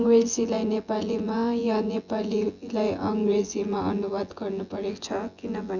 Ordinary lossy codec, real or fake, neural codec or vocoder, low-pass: none; fake; vocoder, 24 kHz, 100 mel bands, Vocos; 7.2 kHz